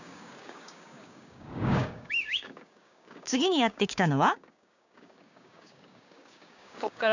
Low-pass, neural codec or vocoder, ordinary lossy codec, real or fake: 7.2 kHz; codec, 16 kHz, 6 kbps, DAC; none; fake